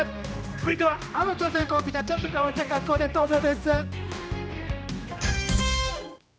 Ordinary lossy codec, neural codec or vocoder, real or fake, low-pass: none; codec, 16 kHz, 1 kbps, X-Codec, HuBERT features, trained on general audio; fake; none